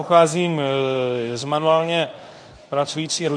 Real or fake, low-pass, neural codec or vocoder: fake; 9.9 kHz; codec, 24 kHz, 0.9 kbps, WavTokenizer, medium speech release version 1